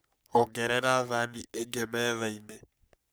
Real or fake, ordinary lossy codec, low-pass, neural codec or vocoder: fake; none; none; codec, 44.1 kHz, 3.4 kbps, Pupu-Codec